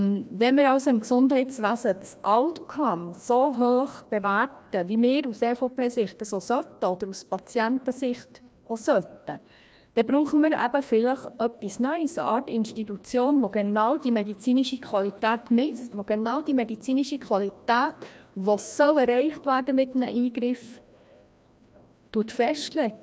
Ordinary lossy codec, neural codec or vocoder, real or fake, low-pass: none; codec, 16 kHz, 1 kbps, FreqCodec, larger model; fake; none